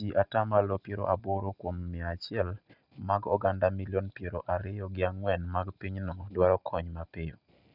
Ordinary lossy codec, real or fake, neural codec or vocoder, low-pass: none; real; none; 5.4 kHz